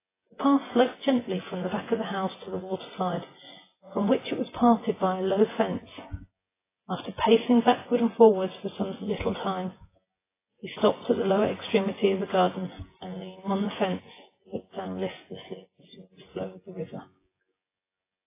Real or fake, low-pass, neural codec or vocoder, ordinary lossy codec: fake; 3.6 kHz; vocoder, 24 kHz, 100 mel bands, Vocos; AAC, 16 kbps